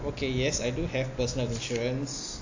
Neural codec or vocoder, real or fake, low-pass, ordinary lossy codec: none; real; 7.2 kHz; AAC, 48 kbps